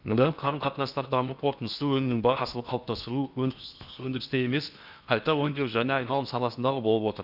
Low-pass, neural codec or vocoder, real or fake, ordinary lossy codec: 5.4 kHz; codec, 16 kHz in and 24 kHz out, 0.8 kbps, FocalCodec, streaming, 65536 codes; fake; none